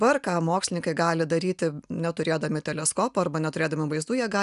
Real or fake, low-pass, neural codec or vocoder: real; 10.8 kHz; none